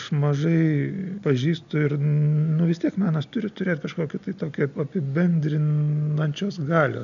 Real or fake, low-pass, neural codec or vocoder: real; 7.2 kHz; none